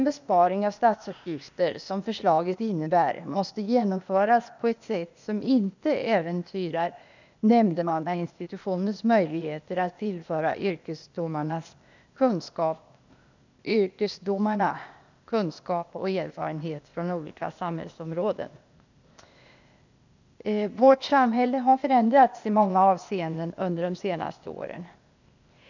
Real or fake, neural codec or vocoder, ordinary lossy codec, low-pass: fake; codec, 16 kHz, 0.8 kbps, ZipCodec; none; 7.2 kHz